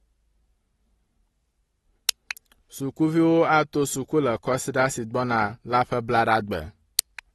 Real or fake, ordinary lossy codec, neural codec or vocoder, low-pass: real; AAC, 32 kbps; none; 19.8 kHz